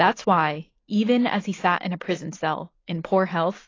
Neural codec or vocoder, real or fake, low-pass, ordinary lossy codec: none; real; 7.2 kHz; AAC, 32 kbps